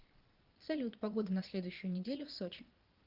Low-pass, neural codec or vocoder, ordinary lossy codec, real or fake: 5.4 kHz; vocoder, 22.05 kHz, 80 mel bands, Vocos; Opus, 32 kbps; fake